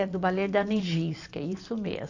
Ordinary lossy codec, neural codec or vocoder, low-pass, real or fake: AAC, 48 kbps; vocoder, 44.1 kHz, 128 mel bands every 512 samples, BigVGAN v2; 7.2 kHz; fake